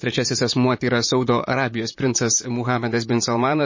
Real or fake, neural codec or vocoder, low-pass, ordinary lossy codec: fake; vocoder, 44.1 kHz, 128 mel bands, Pupu-Vocoder; 7.2 kHz; MP3, 32 kbps